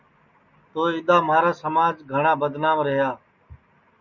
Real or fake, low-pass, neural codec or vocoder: real; 7.2 kHz; none